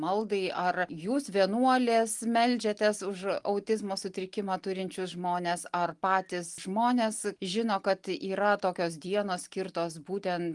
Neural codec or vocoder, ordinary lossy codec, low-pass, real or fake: none; Opus, 24 kbps; 10.8 kHz; real